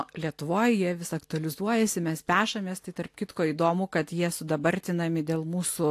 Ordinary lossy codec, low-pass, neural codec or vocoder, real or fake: AAC, 64 kbps; 14.4 kHz; none; real